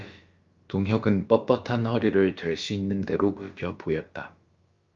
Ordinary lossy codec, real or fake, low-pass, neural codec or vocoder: Opus, 32 kbps; fake; 7.2 kHz; codec, 16 kHz, about 1 kbps, DyCAST, with the encoder's durations